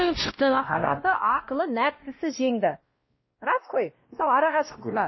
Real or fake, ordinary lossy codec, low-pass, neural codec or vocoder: fake; MP3, 24 kbps; 7.2 kHz; codec, 16 kHz, 1 kbps, X-Codec, WavLM features, trained on Multilingual LibriSpeech